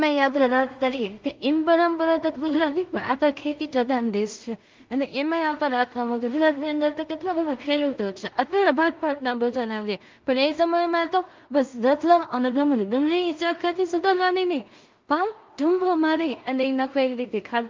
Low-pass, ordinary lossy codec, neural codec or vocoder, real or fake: 7.2 kHz; Opus, 32 kbps; codec, 16 kHz in and 24 kHz out, 0.4 kbps, LongCat-Audio-Codec, two codebook decoder; fake